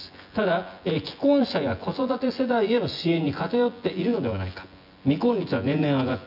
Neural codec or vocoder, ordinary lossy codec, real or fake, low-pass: vocoder, 24 kHz, 100 mel bands, Vocos; none; fake; 5.4 kHz